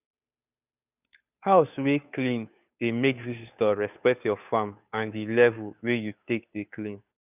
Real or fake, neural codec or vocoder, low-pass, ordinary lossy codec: fake; codec, 16 kHz, 2 kbps, FunCodec, trained on Chinese and English, 25 frames a second; 3.6 kHz; none